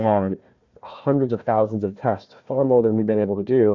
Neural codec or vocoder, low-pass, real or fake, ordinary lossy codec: codec, 16 kHz, 1 kbps, FunCodec, trained on Chinese and English, 50 frames a second; 7.2 kHz; fake; Opus, 64 kbps